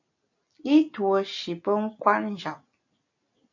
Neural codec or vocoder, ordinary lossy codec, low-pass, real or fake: none; AAC, 48 kbps; 7.2 kHz; real